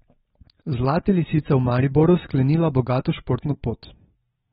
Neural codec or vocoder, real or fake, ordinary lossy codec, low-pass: none; real; AAC, 16 kbps; 14.4 kHz